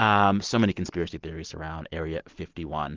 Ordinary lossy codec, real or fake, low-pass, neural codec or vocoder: Opus, 16 kbps; real; 7.2 kHz; none